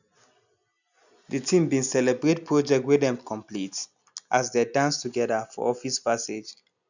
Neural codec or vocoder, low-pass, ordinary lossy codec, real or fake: none; 7.2 kHz; none; real